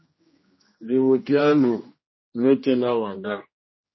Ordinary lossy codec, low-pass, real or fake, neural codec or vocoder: MP3, 24 kbps; 7.2 kHz; fake; codec, 16 kHz, 1 kbps, X-Codec, HuBERT features, trained on general audio